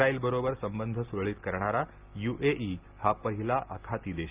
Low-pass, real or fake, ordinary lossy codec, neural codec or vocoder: 3.6 kHz; real; Opus, 32 kbps; none